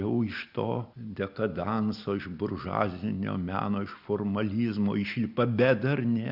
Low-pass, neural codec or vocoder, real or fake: 5.4 kHz; none; real